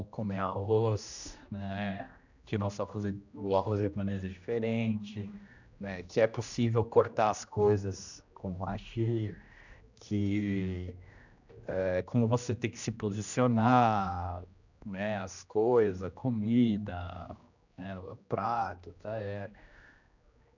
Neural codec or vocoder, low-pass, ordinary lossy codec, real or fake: codec, 16 kHz, 1 kbps, X-Codec, HuBERT features, trained on general audio; 7.2 kHz; none; fake